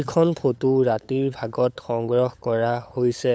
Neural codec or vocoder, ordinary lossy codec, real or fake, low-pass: codec, 16 kHz, 8 kbps, FunCodec, trained on LibriTTS, 25 frames a second; none; fake; none